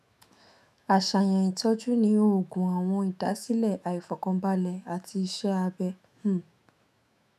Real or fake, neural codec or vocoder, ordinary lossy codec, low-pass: fake; autoencoder, 48 kHz, 128 numbers a frame, DAC-VAE, trained on Japanese speech; none; 14.4 kHz